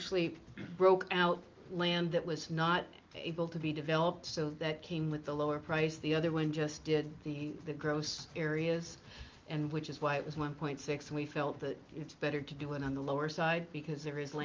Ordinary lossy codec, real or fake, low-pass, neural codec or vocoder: Opus, 24 kbps; real; 7.2 kHz; none